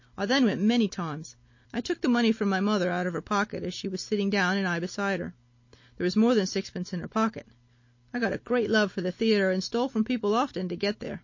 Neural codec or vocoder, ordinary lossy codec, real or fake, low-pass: none; MP3, 32 kbps; real; 7.2 kHz